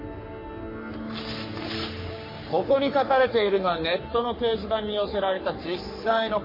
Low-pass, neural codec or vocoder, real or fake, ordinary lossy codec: 5.4 kHz; codec, 44.1 kHz, 3.4 kbps, Pupu-Codec; fake; MP3, 24 kbps